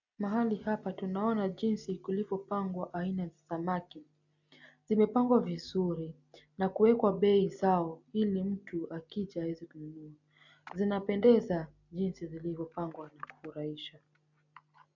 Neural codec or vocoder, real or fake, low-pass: none; real; 7.2 kHz